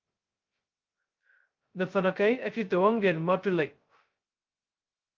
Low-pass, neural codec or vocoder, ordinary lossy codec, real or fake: 7.2 kHz; codec, 16 kHz, 0.2 kbps, FocalCodec; Opus, 32 kbps; fake